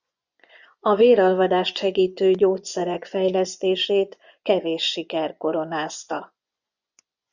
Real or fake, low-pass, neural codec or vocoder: fake; 7.2 kHz; vocoder, 24 kHz, 100 mel bands, Vocos